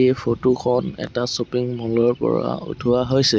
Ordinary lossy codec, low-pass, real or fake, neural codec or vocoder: none; none; real; none